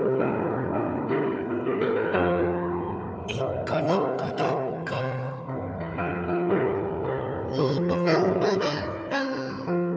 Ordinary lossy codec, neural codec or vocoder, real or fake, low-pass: none; codec, 16 kHz, 4 kbps, FunCodec, trained on Chinese and English, 50 frames a second; fake; none